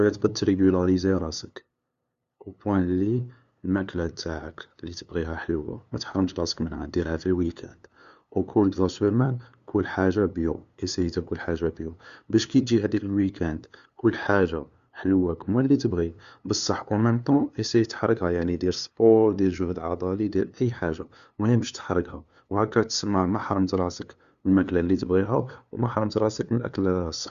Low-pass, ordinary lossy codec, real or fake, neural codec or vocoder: 7.2 kHz; Opus, 64 kbps; fake; codec, 16 kHz, 2 kbps, FunCodec, trained on LibriTTS, 25 frames a second